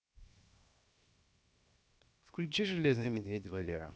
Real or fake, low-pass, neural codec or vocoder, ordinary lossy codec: fake; none; codec, 16 kHz, 0.7 kbps, FocalCodec; none